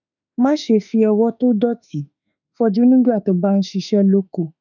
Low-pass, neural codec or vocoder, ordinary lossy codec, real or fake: 7.2 kHz; autoencoder, 48 kHz, 32 numbers a frame, DAC-VAE, trained on Japanese speech; none; fake